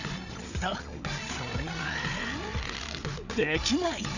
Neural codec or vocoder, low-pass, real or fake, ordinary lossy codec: codec, 16 kHz, 8 kbps, FreqCodec, larger model; 7.2 kHz; fake; none